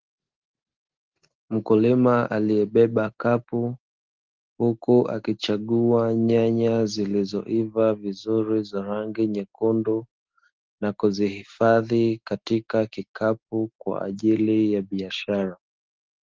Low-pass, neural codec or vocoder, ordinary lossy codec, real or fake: 7.2 kHz; none; Opus, 16 kbps; real